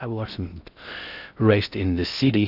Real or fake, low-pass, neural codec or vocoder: fake; 5.4 kHz; codec, 16 kHz in and 24 kHz out, 0.6 kbps, FocalCodec, streaming, 4096 codes